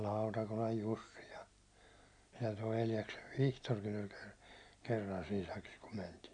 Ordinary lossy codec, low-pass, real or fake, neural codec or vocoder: none; 9.9 kHz; real; none